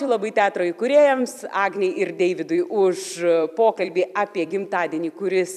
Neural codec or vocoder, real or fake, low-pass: vocoder, 44.1 kHz, 128 mel bands every 256 samples, BigVGAN v2; fake; 14.4 kHz